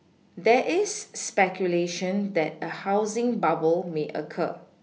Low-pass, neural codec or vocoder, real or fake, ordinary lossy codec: none; none; real; none